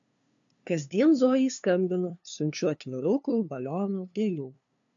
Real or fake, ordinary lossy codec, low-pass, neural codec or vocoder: fake; AAC, 64 kbps; 7.2 kHz; codec, 16 kHz, 2 kbps, FunCodec, trained on LibriTTS, 25 frames a second